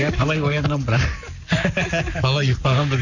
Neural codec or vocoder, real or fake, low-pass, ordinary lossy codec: codec, 44.1 kHz, 7.8 kbps, Pupu-Codec; fake; 7.2 kHz; none